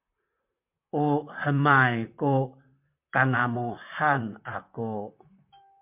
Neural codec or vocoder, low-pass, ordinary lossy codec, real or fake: vocoder, 44.1 kHz, 128 mel bands, Pupu-Vocoder; 3.6 kHz; MP3, 32 kbps; fake